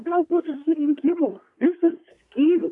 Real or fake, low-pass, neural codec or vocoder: fake; 10.8 kHz; codec, 24 kHz, 1 kbps, SNAC